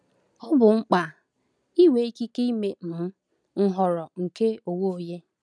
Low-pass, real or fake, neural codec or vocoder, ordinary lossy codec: none; real; none; none